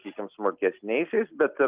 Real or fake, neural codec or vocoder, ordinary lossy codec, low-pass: real; none; Opus, 24 kbps; 3.6 kHz